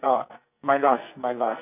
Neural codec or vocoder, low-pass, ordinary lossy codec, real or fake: codec, 44.1 kHz, 2.6 kbps, SNAC; 3.6 kHz; none; fake